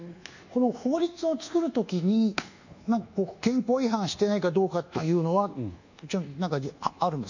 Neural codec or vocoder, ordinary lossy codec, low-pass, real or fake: codec, 24 kHz, 1.2 kbps, DualCodec; none; 7.2 kHz; fake